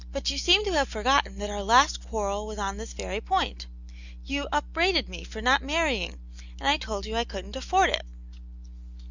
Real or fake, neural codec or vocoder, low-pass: real; none; 7.2 kHz